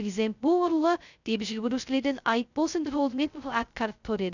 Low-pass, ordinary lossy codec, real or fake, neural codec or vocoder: 7.2 kHz; none; fake; codec, 16 kHz, 0.2 kbps, FocalCodec